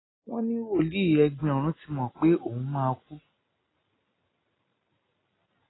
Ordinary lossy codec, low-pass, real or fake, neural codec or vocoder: AAC, 16 kbps; 7.2 kHz; real; none